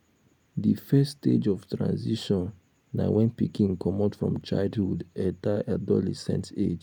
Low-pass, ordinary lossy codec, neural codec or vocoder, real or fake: 19.8 kHz; none; none; real